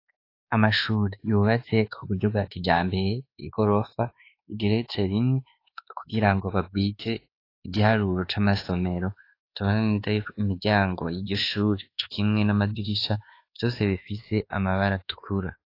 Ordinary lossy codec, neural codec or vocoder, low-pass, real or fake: AAC, 32 kbps; codec, 24 kHz, 1.2 kbps, DualCodec; 5.4 kHz; fake